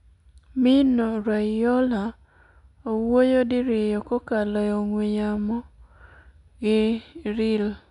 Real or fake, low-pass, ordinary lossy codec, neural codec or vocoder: real; 10.8 kHz; none; none